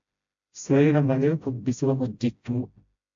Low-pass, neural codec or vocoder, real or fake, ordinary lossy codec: 7.2 kHz; codec, 16 kHz, 0.5 kbps, FreqCodec, smaller model; fake; AAC, 64 kbps